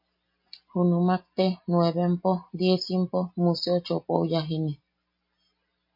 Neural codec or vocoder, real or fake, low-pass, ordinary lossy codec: none; real; 5.4 kHz; MP3, 32 kbps